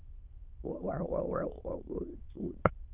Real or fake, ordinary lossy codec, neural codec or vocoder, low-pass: fake; Opus, 32 kbps; autoencoder, 22.05 kHz, a latent of 192 numbers a frame, VITS, trained on many speakers; 3.6 kHz